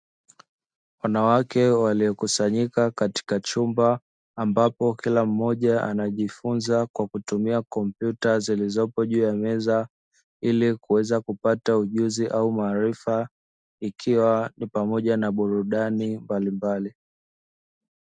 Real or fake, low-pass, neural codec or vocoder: real; 9.9 kHz; none